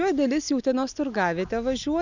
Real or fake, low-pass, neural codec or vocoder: real; 7.2 kHz; none